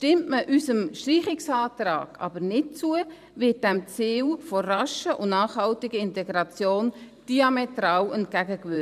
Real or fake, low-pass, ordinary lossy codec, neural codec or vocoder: fake; 14.4 kHz; none; vocoder, 44.1 kHz, 128 mel bands every 512 samples, BigVGAN v2